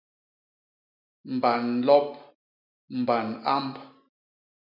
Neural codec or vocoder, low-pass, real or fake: none; 5.4 kHz; real